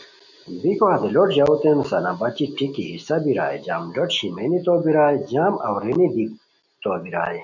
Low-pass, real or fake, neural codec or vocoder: 7.2 kHz; real; none